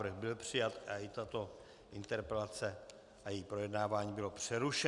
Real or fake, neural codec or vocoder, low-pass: real; none; 10.8 kHz